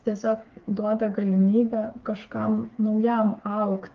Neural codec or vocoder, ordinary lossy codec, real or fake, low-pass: codec, 16 kHz, 4 kbps, FreqCodec, smaller model; Opus, 32 kbps; fake; 7.2 kHz